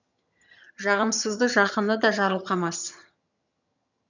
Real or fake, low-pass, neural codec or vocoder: fake; 7.2 kHz; vocoder, 22.05 kHz, 80 mel bands, HiFi-GAN